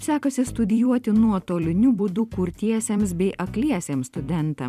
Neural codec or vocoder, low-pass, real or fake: vocoder, 44.1 kHz, 128 mel bands every 256 samples, BigVGAN v2; 14.4 kHz; fake